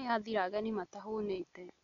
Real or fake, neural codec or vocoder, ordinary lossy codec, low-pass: real; none; none; 7.2 kHz